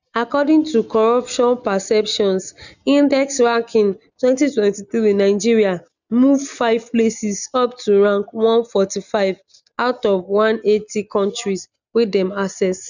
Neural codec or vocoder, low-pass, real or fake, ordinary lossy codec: none; 7.2 kHz; real; none